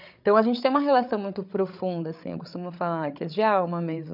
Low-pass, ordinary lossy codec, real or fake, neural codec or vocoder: 5.4 kHz; none; fake; codec, 16 kHz, 8 kbps, FreqCodec, larger model